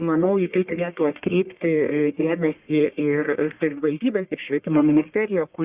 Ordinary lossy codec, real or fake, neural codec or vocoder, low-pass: Opus, 64 kbps; fake; codec, 44.1 kHz, 1.7 kbps, Pupu-Codec; 3.6 kHz